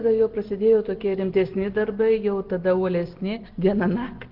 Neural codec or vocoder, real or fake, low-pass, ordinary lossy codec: none; real; 5.4 kHz; Opus, 16 kbps